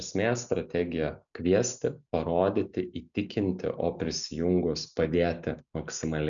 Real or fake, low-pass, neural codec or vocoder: real; 7.2 kHz; none